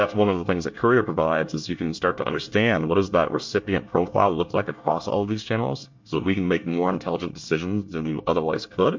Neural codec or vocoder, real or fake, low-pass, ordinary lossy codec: codec, 24 kHz, 1 kbps, SNAC; fake; 7.2 kHz; MP3, 48 kbps